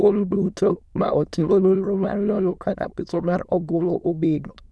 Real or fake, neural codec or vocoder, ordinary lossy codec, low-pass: fake; autoencoder, 22.05 kHz, a latent of 192 numbers a frame, VITS, trained on many speakers; none; none